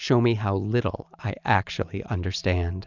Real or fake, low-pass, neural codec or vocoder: real; 7.2 kHz; none